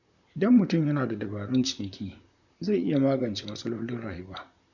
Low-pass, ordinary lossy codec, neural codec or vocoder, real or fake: 7.2 kHz; none; vocoder, 44.1 kHz, 128 mel bands, Pupu-Vocoder; fake